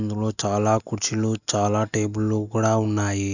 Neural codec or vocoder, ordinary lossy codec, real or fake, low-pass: none; none; real; 7.2 kHz